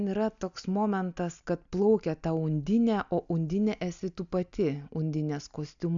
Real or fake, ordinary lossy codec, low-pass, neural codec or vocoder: real; MP3, 96 kbps; 7.2 kHz; none